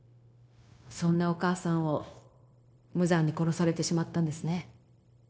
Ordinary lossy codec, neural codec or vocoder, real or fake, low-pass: none; codec, 16 kHz, 0.9 kbps, LongCat-Audio-Codec; fake; none